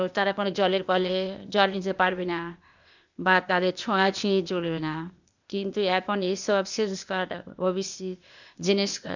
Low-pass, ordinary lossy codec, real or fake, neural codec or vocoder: 7.2 kHz; none; fake; codec, 16 kHz, 0.8 kbps, ZipCodec